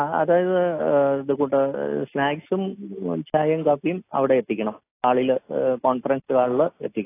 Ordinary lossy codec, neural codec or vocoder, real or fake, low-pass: AAC, 24 kbps; none; real; 3.6 kHz